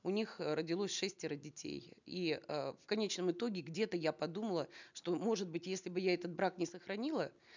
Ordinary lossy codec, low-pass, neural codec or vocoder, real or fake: none; 7.2 kHz; none; real